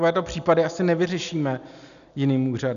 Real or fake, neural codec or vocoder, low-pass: real; none; 7.2 kHz